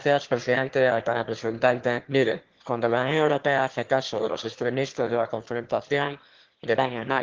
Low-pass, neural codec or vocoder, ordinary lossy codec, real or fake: 7.2 kHz; autoencoder, 22.05 kHz, a latent of 192 numbers a frame, VITS, trained on one speaker; Opus, 16 kbps; fake